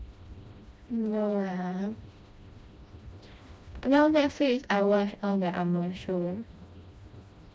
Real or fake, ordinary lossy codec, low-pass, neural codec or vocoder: fake; none; none; codec, 16 kHz, 1 kbps, FreqCodec, smaller model